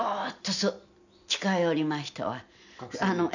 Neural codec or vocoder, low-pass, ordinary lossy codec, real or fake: none; 7.2 kHz; none; real